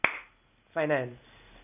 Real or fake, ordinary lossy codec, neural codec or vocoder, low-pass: real; none; none; 3.6 kHz